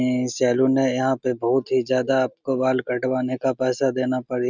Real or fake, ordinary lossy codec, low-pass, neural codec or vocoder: real; none; 7.2 kHz; none